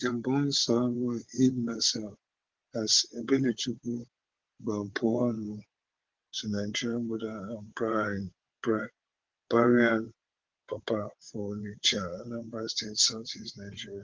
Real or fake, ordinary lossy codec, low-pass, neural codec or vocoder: fake; Opus, 16 kbps; 7.2 kHz; vocoder, 22.05 kHz, 80 mel bands, WaveNeXt